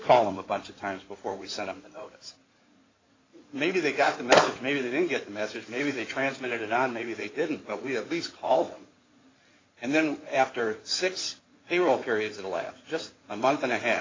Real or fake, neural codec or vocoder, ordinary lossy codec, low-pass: fake; codec, 16 kHz in and 24 kHz out, 2.2 kbps, FireRedTTS-2 codec; AAC, 32 kbps; 7.2 kHz